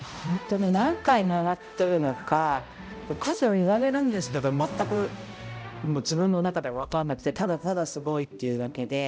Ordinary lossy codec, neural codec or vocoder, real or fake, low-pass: none; codec, 16 kHz, 0.5 kbps, X-Codec, HuBERT features, trained on balanced general audio; fake; none